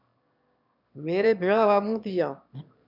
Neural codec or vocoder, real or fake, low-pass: autoencoder, 22.05 kHz, a latent of 192 numbers a frame, VITS, trained on one speaker; fake; 5.4 kHz